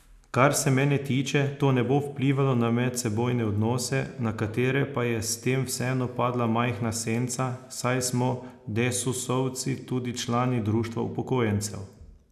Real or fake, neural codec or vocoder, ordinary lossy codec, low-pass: real; none; none; 14.4 kHz